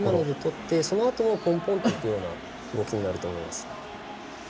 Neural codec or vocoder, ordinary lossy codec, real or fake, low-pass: none; none; real; none